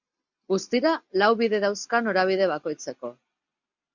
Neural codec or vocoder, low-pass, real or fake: none; 7.2 kHz; real